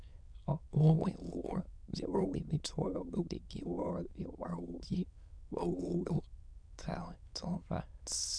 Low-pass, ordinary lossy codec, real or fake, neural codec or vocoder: 9.9 kHz; none; fake; autoencoder, 22.05 kHz, a latent of 192 numbers a frame, VITS, trained on many speakers